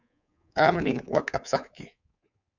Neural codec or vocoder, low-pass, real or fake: codec, 24 kHz, 3.1 kbps, DualCodec; 7.2 kHz; fake